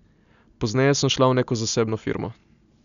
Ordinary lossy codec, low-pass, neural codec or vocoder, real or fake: none; 7.2 kHz; none; real